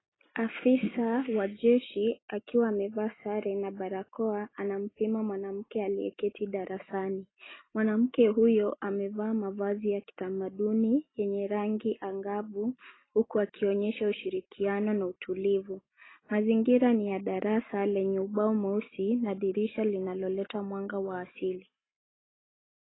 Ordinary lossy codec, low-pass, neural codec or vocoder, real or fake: AAC, 16 kbps; 7.2 kHz; none; real